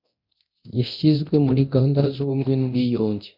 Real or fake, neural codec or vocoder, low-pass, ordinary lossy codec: fake; codec, 24 kHz, 0.9 kbps, DualCodec; 5.4 kHz; MP3, 48 kbps